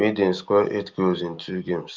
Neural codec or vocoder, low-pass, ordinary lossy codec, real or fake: none; 7.2 kHz; Opus, 24 kbps; real